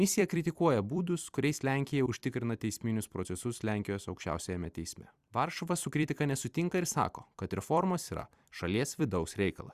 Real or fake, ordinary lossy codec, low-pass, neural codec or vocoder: fake; Opus, 64 kbps; 14.4 kHz; vocoder, 44.1 kHz, 128 mel bands every 256 samples, BigVGAN v2